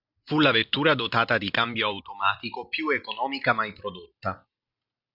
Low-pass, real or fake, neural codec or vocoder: 5.4 kHz; real; none